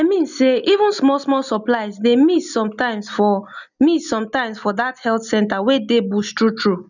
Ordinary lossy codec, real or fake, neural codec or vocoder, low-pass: none; real; none; 7.2 kHz